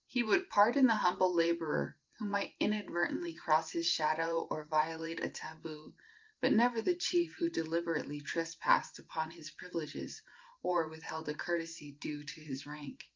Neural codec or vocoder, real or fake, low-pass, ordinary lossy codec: vocoder, 44.1 kHz, 128 mel bands every 512 samples, BigVGAN v2; fake; 7.2 kHz; Opus, 24 kbps